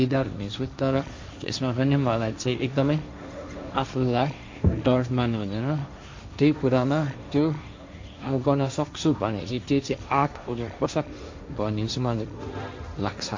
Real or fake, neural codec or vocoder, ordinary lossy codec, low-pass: fake; codec, 16 kHz, 1.1 kbps, Voila-Tokenizer; MP3, 64 kbps; 7.2 kHz